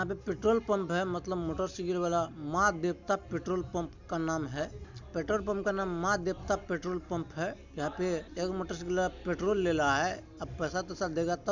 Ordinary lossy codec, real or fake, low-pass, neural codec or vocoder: none; real; 7.2 kHz; none